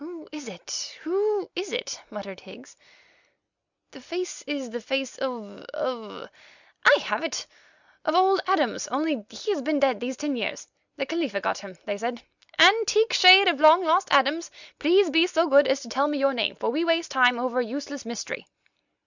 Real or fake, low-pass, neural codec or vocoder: real; 7.2 kHz; none